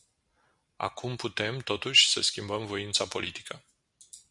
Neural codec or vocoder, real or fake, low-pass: none; real; 10.8 kHz